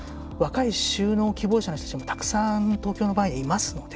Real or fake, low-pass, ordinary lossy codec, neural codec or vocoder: real; none; none; none